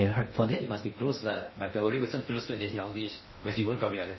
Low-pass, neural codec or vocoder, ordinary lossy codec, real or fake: 7.2 kHz; codec, 16 kHz in and 24 kHz out, 0.6 kbps, FocalCodec, streaming, 2048 codes; MP3, 24 kbps; fake